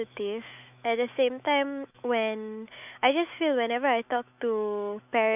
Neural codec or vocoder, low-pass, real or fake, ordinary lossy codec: autoencoder, 48 kHz, 128 numbers a frame, DAC-VAE, trained on Japanese speech; 3.6 kHz; fake; none